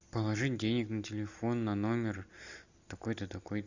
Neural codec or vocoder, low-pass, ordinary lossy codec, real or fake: none; 7.2 kHz; Opus, 64 kbps; real